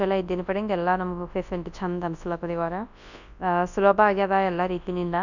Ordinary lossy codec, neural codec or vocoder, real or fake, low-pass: none; codec, 24 kHz, 0.9 kbps, WavTokenizer, large speech release; fake; 7.2 kHz